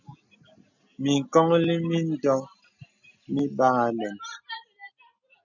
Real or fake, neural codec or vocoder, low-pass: real; none; 7.2 kHz